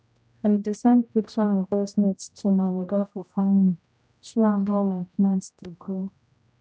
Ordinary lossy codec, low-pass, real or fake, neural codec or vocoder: none; none; fake; codec, 16 kHz, 0.5 kbps, X-Codec, HuBERT features, trained on general audio